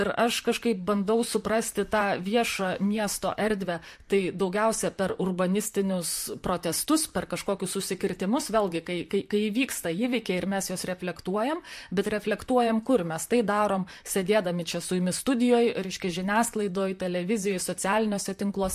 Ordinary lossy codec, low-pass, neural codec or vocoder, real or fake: MP3, 64 kbps; 14.4 kHz; vocoder, 44.1 kHz, 128 mel bands, Pupu-Vocoder; fake